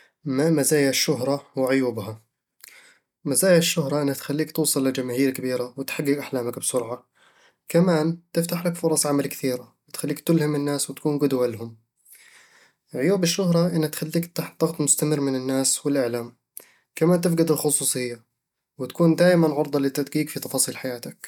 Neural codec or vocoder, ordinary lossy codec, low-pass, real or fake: none; none; 19.8 kHz; real